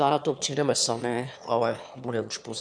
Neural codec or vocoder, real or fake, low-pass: autoencoder, 22.05 kHz, a latent of 192 numbers a frame, VITS, trained on one speaker; fake; 9.9 kHz